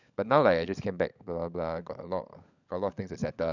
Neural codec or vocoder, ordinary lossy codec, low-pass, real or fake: codec, 16 kHz, 16 kbps, FunCodec, trained on LibriTTS, 50 frames a second; none; 7.2 kHz; fake